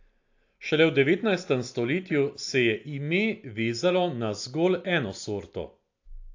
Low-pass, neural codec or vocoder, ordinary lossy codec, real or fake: 7.2 kHz; none; AAC, 48 kbps; real